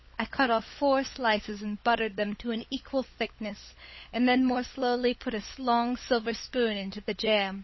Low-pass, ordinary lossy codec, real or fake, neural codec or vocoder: 7.2 kHz; MP3, 24 kbps; fake; codec, 16 kHz, 16 kbps, FunCodec, trained on LibriTTS, 50 frames a second